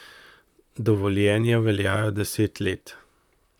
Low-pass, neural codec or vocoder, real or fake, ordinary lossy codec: 19.8 kHz; vocoder, 44.1 kHz, 128 mel bands, Pupu-Vocoder; fake; none